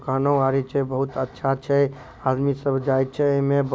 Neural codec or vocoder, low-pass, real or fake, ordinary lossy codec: none; none; real; none